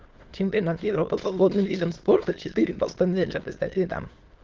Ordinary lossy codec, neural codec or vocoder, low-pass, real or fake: Opus, 16 kbps; autoencoder, 22.05 kHz, a latent of 192 numbers a frame, VITS, trained on many speakers; 7.2 kHz; fake